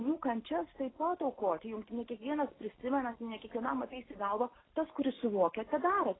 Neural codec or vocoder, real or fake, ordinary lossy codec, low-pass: none; real; AAC, 16 kbps; 7.2 kHz